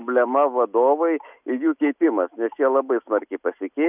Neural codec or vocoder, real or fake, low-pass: none; real; 3.6 kHz